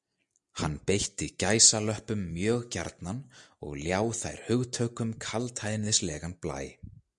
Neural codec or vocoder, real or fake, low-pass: none; real; 10.8 kHz